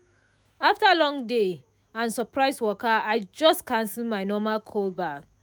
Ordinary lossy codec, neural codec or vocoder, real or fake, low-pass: none; autoencoder, 48 kHz, 128 numbers a frame, DAC-VAE, trained on Japanese speech; fake; none